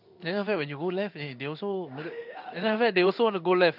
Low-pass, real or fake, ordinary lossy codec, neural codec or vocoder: 5.4 kHz; fake; none; codec, 16 kHz in and 24 kHz out, 1 kbps, XY-Tokenizer